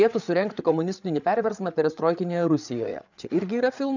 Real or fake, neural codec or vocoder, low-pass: fake; codec, 16 kHz, 8 kbps, FreqCodec, larger model; 7.2 kHz